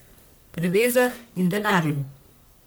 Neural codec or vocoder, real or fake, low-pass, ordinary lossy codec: codec, 44.1 kHz, 1.7 kbps, Pupu-Codec; fake; none; none